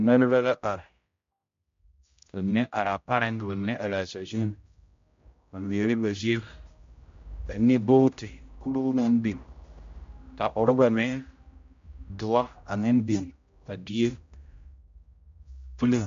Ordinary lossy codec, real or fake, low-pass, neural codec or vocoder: MP3, 48 kbps; fake; 7.2 kHz; codec, 16 kHz, 0.5 kbps, X-Codec, HuBERT features, trained on general audio